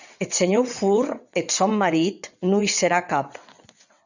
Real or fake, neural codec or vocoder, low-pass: fake; vocoder, 22.05 kHz, 80 mel bands, WaveNeXt; 7.2 kHz